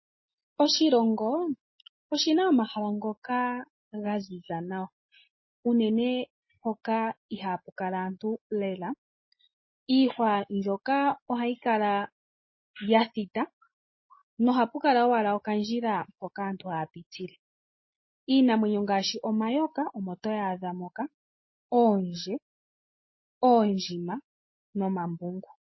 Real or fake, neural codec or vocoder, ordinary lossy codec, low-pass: real; none; MP3, 24 kbps; 7.2 kHz